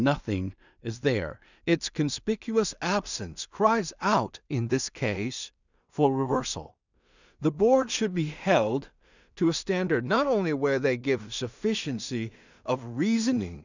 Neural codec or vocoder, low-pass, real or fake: codec, 16 kHz in and 24 kHz out, 0.4 kbps, LongCat-Audio-Codec, two codebook decoder; 7.2 kHz; fake